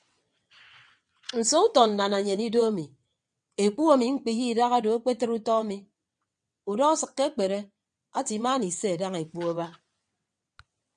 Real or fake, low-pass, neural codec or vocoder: fake; 9.9 kHz; vocoder, 22.05 kHz, 80 mel bands, WaveNeXt